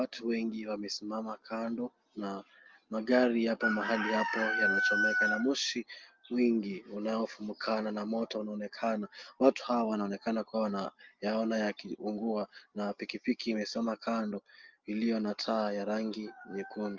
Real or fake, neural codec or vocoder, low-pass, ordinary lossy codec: real; none; 7.2 kHz; Opus, 24 kbps